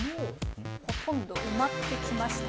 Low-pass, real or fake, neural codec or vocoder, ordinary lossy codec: none; real; none; none